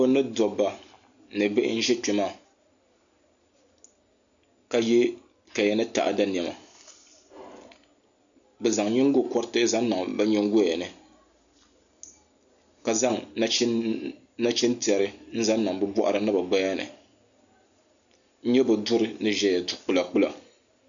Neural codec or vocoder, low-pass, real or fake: none; 7.2 kHz; real